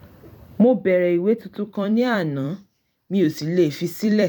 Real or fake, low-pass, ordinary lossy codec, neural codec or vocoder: fake; 19.8 kHz; none; vocoder, 44.1 kHz, 128 mel bands every 256 samples, BigVGAN v2